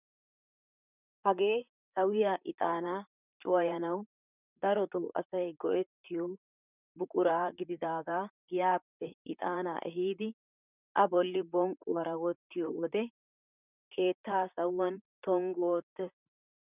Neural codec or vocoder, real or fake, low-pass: vocoder, 44.1 kHz, 128 mel bands, Pupu-Vocoder; fake; 3.6 kHz